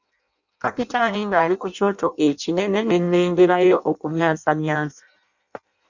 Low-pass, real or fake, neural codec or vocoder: 7.2 kHz; fake; codec, 16 kHz in and 24 kHz out, 0.6 kbps, FireRedTTS-2 codec